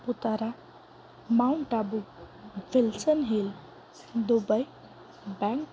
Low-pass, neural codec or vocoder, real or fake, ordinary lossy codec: none; none; real; none